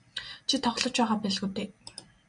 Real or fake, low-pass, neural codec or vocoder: real; 9.9 kHz; none